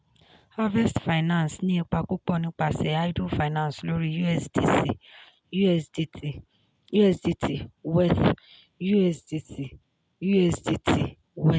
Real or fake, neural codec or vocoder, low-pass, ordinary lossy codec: real; none; none; none